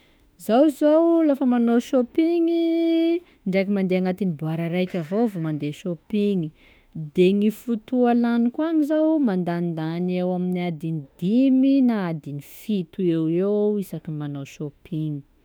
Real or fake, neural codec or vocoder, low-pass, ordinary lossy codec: fake; autoencoder, 48 kHz, 32 numbers a frame, DAC-VAE, trained on Japanese speech; none; none